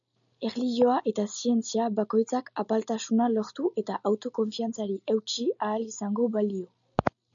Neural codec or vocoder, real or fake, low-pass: none; real; 7.2 kHz